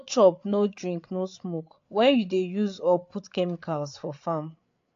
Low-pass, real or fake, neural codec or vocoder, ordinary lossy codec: 7.2 kHz; fake; codec, 16 kHz, 8 kbps, FreqCodec, larger model; AAC, 48 kbps